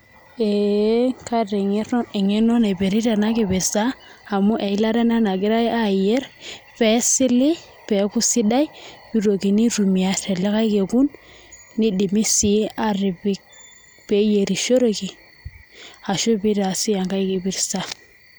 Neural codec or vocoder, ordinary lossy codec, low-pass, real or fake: none; none; none; real